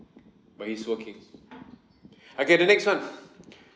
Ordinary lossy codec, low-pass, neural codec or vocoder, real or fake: none; none; none; real